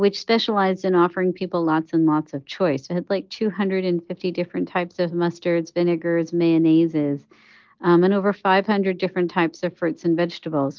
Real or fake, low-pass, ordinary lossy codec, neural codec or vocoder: real; 7.2 kHz; Opus, 32 kbps; none